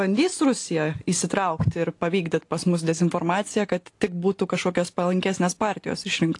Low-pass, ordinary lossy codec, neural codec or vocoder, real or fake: 10.8 kHz; AAC, 48 kbps; none; real